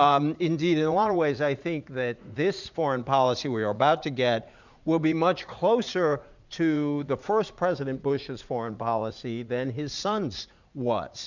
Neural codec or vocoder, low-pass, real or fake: vocoder, 44.1 kHz, 80 mel bands, Vocos; 7.2 kHz; fake